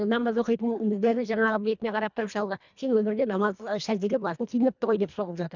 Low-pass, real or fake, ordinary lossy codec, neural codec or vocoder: 7.2 kHz; fake; none; codec, 24 kHz, 1.5 kbps, HILCodec